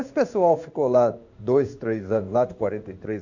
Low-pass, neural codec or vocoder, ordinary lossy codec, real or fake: 7.2 kHz; codec, 16 kHz in and 24 kHz out, 1 kbps, XY-Tokenizer; none; fake